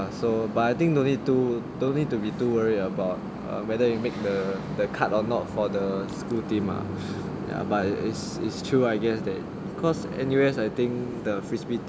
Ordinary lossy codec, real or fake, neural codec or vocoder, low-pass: none; real; none; none